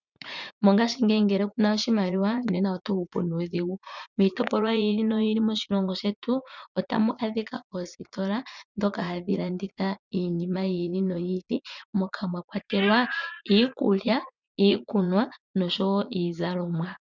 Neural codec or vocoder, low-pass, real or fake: vocoder, 44.1 kHz, 80 mel bands, Vocos; 7.2 kHz; fake